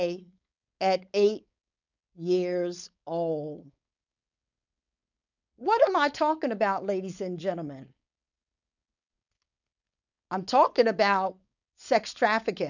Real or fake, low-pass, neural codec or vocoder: fake; 7.2 kHz; codec, 16 kHz, 4.8 kbps, FACodec